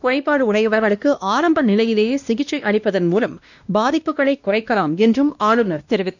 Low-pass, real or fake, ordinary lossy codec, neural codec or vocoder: 7.2 kHz; fake; none; codec, 16 kHz, 1 kbps, X-Codec, WavLM features, trained on Multilingual LibriSpeech